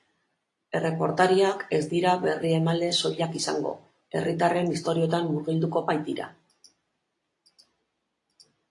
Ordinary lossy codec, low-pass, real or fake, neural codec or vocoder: AAC, 48 kbps; 10.8 kHz; real; none